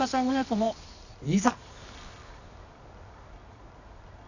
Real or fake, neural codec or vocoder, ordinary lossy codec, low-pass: fake; codec, 24 kHz, 0.9 kbps, WavTokenizer, medium music audio release; none; 7.2 kHz